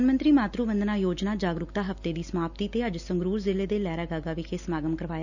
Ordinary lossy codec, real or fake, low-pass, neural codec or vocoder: none; real; 7.2 kHz; none